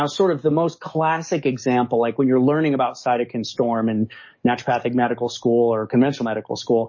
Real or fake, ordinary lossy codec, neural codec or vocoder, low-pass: fake; MP3, 32 kbps; codec, 44.1 kHz, 7.8 kbps, DAC; 7.2 kHz